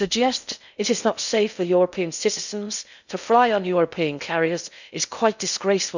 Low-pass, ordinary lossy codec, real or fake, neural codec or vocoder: 7.2 kHz; none; fake; codec, 16 kHz in and 24 kHz out, 0.6 kbps, FocalCodec, streaming, 2048 codes